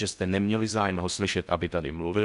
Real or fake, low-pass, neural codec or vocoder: fake; 10.8 kHz; codec, 16 kHz in and 24 kHz out, 0.6 kbps, FocalCodec, streaming, 4096 codes